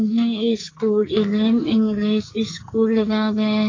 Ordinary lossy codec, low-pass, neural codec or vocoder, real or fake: AAC, 32 kbps; 7.2 kHz; codec, 44.1 kHz, 2.6 kbps, SNAC; fake